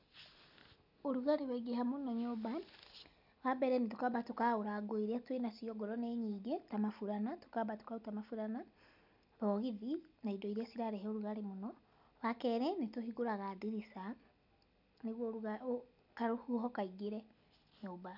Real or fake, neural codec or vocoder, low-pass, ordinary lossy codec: real; none; 5.4 kHz; none